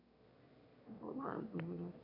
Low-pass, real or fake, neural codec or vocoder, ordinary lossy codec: 5.4 kHz; fake; autoencoder, 22.05 kHz, a latent of 192 numbers a frame, VITS, trained on one speaker; none